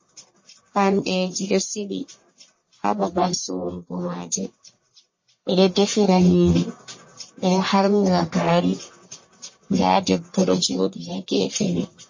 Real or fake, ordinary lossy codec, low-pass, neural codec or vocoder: fake; MP3, 32 kbps; 7.2 kHz; codec, 44.1 kHz, 1.7 kbps, Pupu-Codec